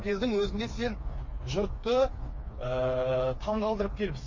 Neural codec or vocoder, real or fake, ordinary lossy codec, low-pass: codec, 16 kHz, 4 kbps, FreqCodec, smaller model; fake; MP3, 32 kbps; 7.2 kHz